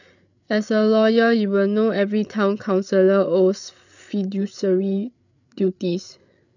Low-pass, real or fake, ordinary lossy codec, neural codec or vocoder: 7.2 kHz; fake; none; codec, 16 kHz, 8 kbps, FreqCodec, larger model